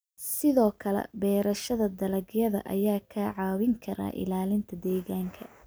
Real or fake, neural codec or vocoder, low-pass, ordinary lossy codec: real; none; none; none